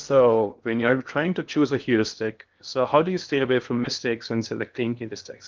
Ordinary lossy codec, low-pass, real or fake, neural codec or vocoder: Opus, 24 kbps; 7.2 kHz; fake; codec, 16 kHz in and 24 kHz out, 0.6 kbps, FocalCodec, streaming, 2048 codes